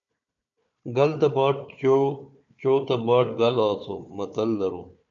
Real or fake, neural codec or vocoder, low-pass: fake; codec, 16 kHz, 4 kbps, FunCodec, trained on Chinese and English, 50 frames a second; 7.2 kHz